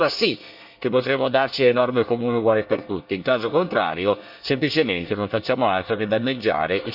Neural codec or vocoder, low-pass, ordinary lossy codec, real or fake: codec, 24 kHz, 1 kbps, SNAC; 5.4 kHz; none; fake